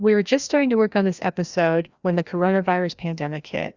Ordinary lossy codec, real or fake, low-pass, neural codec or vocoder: Opus, 64 kbps; fake; 7.2 kHz; codec, 16 kHz, 1 kbps, FreqCodec, larger model